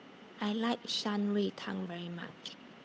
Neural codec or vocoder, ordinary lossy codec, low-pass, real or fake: codec, 16 kHz, 8 kbps, FunCodec, trained on Chinese and English, 25 frames a second; none; none; fake